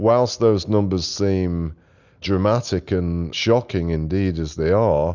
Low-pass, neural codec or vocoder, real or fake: 7.2 kHz; none; real